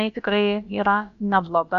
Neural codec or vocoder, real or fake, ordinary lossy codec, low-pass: codec, 16 kHz, about 1 kbps, DyCAST, with the encoder's durations; fake; AAC, 96 kbps; 7.2 kHz